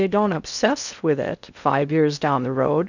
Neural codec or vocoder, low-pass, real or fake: codec, 16 kHz in and 24 kHz out, 0.6 kbps, FocalCodec, streaming, 2048 codes; 7.2 kHz; fake